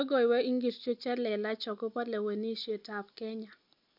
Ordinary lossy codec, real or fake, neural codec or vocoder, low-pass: none; real; none; 5.4 kHz